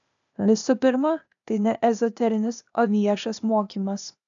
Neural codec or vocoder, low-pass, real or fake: codec, 16 kHz, 0.8 kbps, ZipCodec; 7.2 kHz; fake